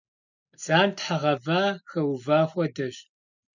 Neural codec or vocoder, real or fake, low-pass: none; real; 7.2 kHz